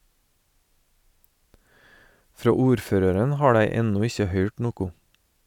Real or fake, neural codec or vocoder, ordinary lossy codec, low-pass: real; none; none; 19.8 kHz